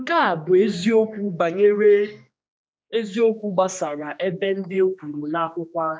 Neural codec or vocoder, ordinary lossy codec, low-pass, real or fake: codec, 16 kHz, 2 kbps, X-Codec, HuBERT features, trained on general audio; none; none; fake